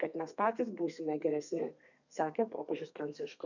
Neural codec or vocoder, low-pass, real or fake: codec, 32 kHz, 1.9 kbps, SNAC; 7.2 kHz; fake